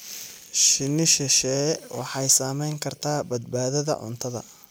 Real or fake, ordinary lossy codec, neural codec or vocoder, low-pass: real; none; none; none